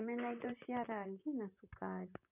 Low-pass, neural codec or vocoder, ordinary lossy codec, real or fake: 3.6 kHz; vocoder, 44.1 kHz, 128 mel bands, Pupu-Vocoder; none; fake